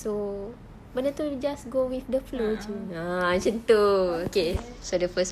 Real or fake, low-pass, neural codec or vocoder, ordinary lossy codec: real; 14.4 kHz; none; none